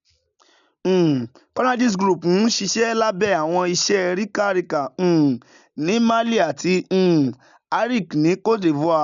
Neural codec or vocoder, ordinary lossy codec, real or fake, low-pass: none; none; real; 7.2 kHz